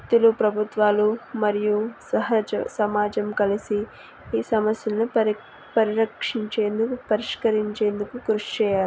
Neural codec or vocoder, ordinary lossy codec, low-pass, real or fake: none; none; none; real